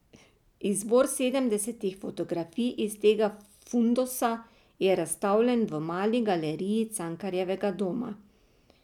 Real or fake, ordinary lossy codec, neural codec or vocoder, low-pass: real; none; none; 19.8 kHz